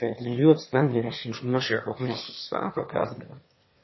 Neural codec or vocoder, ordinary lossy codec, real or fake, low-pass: autoencoder, 22.05 kHz, a latent of 192 numbers a frame, VITS, trained on one speaker; MP3, 24 kbps; fake; 7.2 kHz